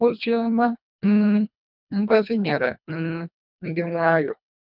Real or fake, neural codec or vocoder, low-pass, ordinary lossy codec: fake; codec, 24 kHz, 1.5 kbps, HILCodec; 5.4 kHz; none